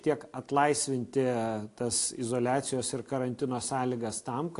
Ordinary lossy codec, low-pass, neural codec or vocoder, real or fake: AAC, 48 kbps; 10.8 kHz; none; real